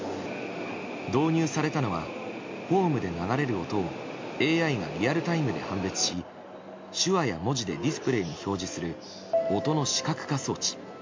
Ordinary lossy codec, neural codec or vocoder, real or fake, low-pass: MP3, 48 kbps; none; real; 7.2 kHz